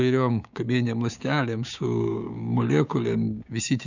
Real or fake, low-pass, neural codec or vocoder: fake; 7.2 kHz; codec, 16 kHz, 6 kbps, DAC